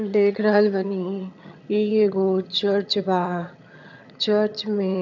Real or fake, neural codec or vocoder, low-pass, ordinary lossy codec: fake; vocoder, 22.05 kHz, 80 mel bands, HiFi-GAN; 7.2 kHz; none